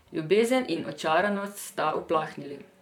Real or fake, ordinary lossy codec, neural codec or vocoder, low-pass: fake; none; vocoder, 44.1 kHz, 128 mel bands, Pupu-Vocoder; 19.8 kHz